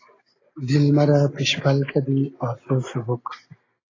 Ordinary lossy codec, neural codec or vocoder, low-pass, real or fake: AAC, 32 kbps; none; 7.2 kHz; real